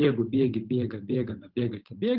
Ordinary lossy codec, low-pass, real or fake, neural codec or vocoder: Opus, 24 kbps; 5.4 kHz; fake; codec, 16 kHz, 8 kbps, FunCodec, trained on Chinese and English, 25 frames a second